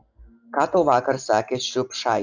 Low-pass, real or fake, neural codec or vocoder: 7.2 kHz; real; none